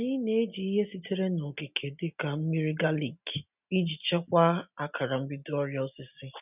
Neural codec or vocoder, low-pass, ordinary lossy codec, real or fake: none; 3.6 kHz; none; real